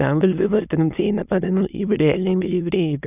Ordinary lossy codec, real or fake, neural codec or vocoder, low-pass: none; fake; autoencoder, 22.05 kHz, a latent of 192 numbers a frame, VITS, trained on many speakers; 3.6 kHz